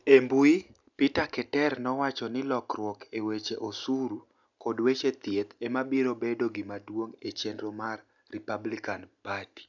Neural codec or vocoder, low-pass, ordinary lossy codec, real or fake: none; 7.2 kHz; none; real